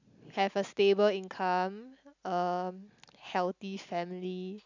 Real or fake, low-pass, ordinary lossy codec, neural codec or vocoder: real; 7.2 kHz; none; none